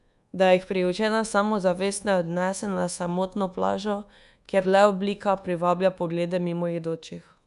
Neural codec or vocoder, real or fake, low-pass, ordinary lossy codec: codec, 24 kHz, 1.2 kbps, DualCodec; fake; 10.8 kHz; none